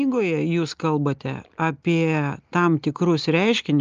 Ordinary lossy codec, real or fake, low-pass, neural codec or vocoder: Opus, 24 kbps; real; 7.2 kHz; none